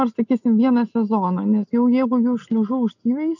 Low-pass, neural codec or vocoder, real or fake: 7.2 kHz; none; real